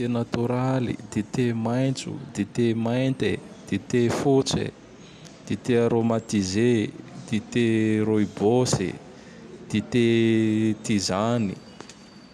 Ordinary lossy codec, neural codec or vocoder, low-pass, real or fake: none; none; 14.4 kHz; real